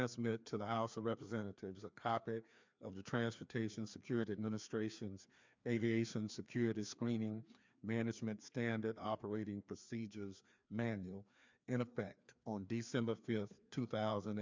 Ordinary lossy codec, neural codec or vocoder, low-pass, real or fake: MP3, 64 kbps; codec, 16 kHz, 2 kbps, FreqCodec, larger model; 7.2 kHz; fake